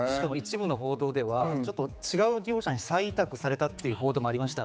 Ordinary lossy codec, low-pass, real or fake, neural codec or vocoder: none; none; fake; codec, 16 kHz, 4 kbps, X-Codec, HuBERT features, trained on general audio